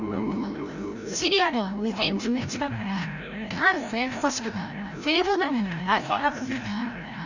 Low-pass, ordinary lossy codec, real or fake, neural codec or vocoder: 7.2 kHz; none; fake; codec, 16 kHz, 0.5 kbps, FreqCodec, larger model